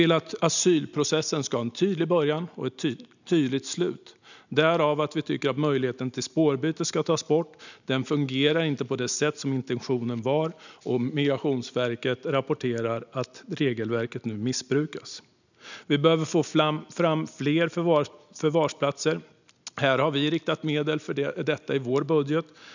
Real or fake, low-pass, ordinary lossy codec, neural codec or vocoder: real; 7.2 kHz; none; none